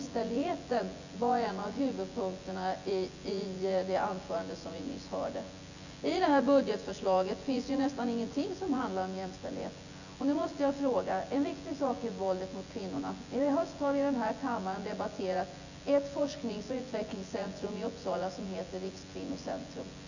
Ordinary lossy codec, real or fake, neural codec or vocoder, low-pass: none; fake; vocoder, 24 kHz, 100 mel bands, Vocos; 7.2 kHz